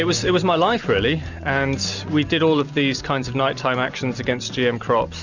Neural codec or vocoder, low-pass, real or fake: none; 7.2 kHz; real